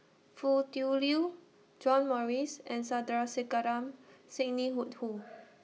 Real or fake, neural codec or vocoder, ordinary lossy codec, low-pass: real; none; none; none